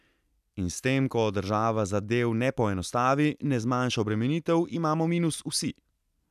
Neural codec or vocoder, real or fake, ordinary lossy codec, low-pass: none; real; none; 14.4 kHz